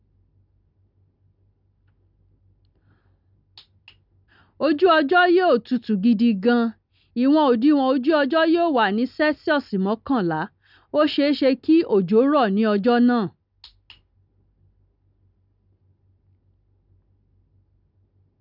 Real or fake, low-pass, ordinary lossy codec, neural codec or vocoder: real; 5.4 kHz; none; none